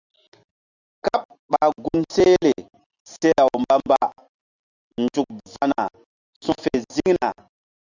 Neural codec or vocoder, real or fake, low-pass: none; real; 7.2 kHz